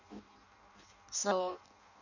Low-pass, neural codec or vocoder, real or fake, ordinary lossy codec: 7.2 kHz; codec, 16 kHz in and 24 kHz out, 0.6 kbps, FireRedTTS-2 codec; fake; none